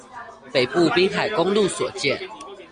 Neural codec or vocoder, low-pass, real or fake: none; 9.9 kHz; real